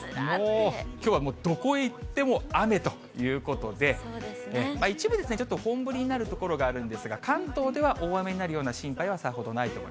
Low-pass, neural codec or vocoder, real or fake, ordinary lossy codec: none; none; real; none